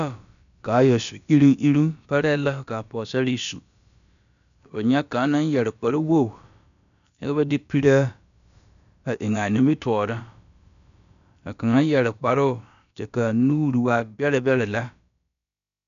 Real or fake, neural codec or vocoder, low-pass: fake; codec, 16 kHz, about 1 kbps, DyCAST, with the encoder's durations; 7.2 kHz